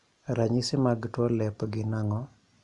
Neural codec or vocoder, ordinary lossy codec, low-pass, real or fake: none; Opus, 64 kbps; 10.8 kHz; real